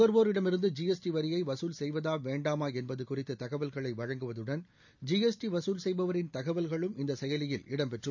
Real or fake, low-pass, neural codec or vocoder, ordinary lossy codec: real; 7.2 kHz; none; none